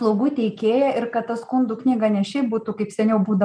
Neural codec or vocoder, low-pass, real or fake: none; 9.9 kHz; real